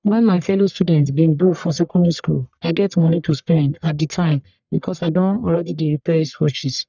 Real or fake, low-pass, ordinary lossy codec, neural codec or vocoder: fake; 7.2 kHz; none; codec, 44.1 kHz, 1.7 kbps, Pupu-Codec